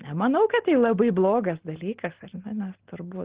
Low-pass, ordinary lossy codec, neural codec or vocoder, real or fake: 3.6 kHz; Opus, 16 kbps; none; real